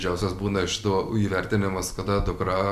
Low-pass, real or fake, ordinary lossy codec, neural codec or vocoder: 14.4 kHz; real; Opus, 64 kbps; none